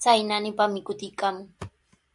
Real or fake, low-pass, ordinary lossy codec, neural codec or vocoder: real; 10.8 kHz; AAC, 64 kbps; none